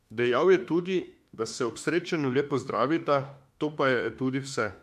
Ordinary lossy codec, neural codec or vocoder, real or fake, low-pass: MP3, 64 kbps; autoencoder, 48 kHz, 32 numbers a frame, DAC-VAE, trained on Japanese speech; fake; 14.4 kHz